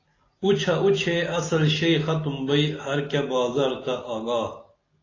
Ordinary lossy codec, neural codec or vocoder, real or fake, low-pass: AAC, 32 kbps; none; real; 7.2 kHz